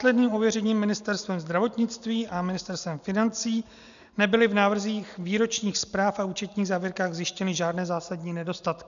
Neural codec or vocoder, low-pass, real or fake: none; 7.2 kHz; real